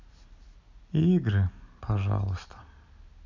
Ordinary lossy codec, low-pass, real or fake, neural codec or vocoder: none; 7.2 kHz; real; none